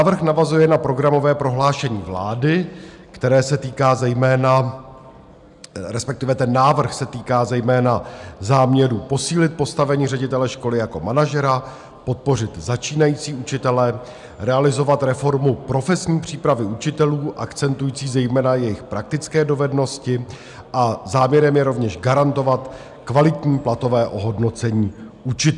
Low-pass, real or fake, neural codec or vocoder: 10.8 kHz; real; none